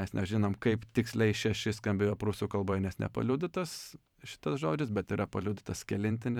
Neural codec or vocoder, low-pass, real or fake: vocoder, 44.1 kHz, 128 mel bands every 512 samples, BigVGAN v2; 19.8 kHz; fake